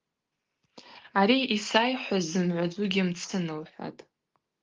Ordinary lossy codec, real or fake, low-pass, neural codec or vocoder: Opus, 32 kbps; real; 7.2 kHz; none